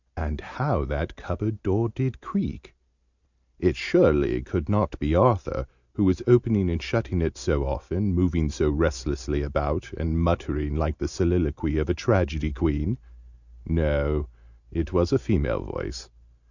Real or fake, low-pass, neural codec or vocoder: real; 7.2 kHz; none